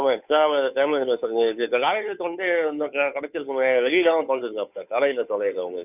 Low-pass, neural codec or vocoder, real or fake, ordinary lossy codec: 3.6 kHz; codec, 16 kHz, 2 kbps, FunCodec, trained on Chinese and English, 25 frames a second; fake; none